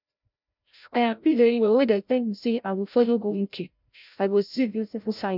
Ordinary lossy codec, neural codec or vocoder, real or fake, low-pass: none; codec, 16 kHz, 0.5 kbps, FreqCodec, larger model; fake; 5.4 kHz